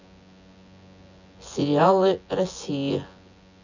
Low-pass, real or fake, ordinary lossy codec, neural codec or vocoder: 7.2 kHz; fake; none; vocoder, 24 kHz, 100 mel bands, Vocos